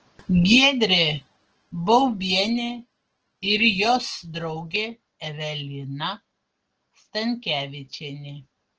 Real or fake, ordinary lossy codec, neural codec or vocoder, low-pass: real; Opus, 16 kbps; none; 7.2 kHz